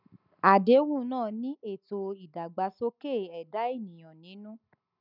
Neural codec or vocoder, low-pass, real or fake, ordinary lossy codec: none; 5.4 kHz; real; none